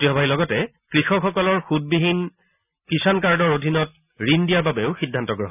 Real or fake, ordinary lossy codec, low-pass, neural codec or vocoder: real; none; 3.6 kHz; none